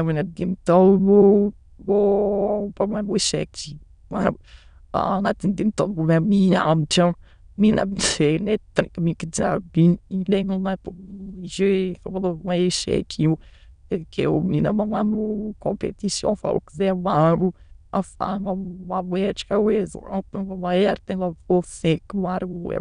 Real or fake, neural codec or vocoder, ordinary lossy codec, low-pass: fake; autoencoder, 22.05 kHz, a latent of 192 numbers a frame, VITS, trained on many speakers; none; 9.9 kHz